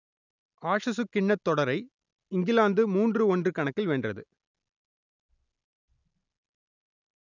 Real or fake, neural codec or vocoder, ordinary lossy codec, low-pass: real; none; none; 7.2 kHz